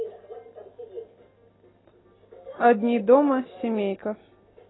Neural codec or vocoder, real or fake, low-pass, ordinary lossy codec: none; real; 7.2 kHz; AAC, 16 kbps